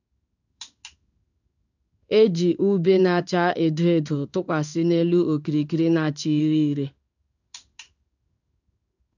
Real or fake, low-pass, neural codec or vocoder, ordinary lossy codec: fake; 7.2 kHz; codec, 16 kHz in and 24 kHz out, 1 kbps, XY-Tokenizer; none